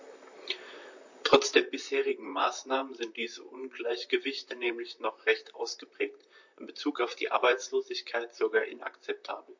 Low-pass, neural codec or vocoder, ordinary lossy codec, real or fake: 7.2 kHz; vocoder, 44.1 kHz, 128 mel bands, Pupu-Vocoder; MP3, 48 kbps; fake